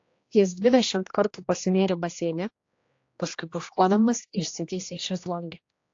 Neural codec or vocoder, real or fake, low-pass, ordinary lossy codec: codec, 16 kHz, 1 kbps, X-Codec, HuBERT features, trained on general audio; fake; 7.2 kHz; AAC, 48 kbps